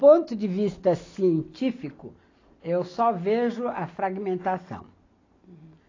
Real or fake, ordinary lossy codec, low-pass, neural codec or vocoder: real; AAC, 32 kbps; 7.2 kHz; none